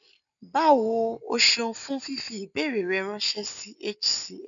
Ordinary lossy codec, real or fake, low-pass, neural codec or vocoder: none; real; 7.2 kHz; none